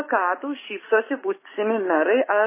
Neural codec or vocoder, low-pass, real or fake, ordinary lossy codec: autoencoder, 48 kHz, 128 numbers a frame, DAC-VAE, trained on Japanese speech; 3.6 kHz; fake; MP3, 16 kbps